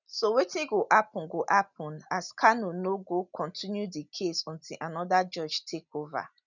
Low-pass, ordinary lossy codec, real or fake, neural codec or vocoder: 7.2 kHz; none; real; none